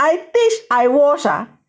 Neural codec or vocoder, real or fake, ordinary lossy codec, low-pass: none; real; none; none